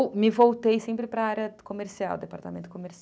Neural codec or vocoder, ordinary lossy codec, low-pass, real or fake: none; none; none; real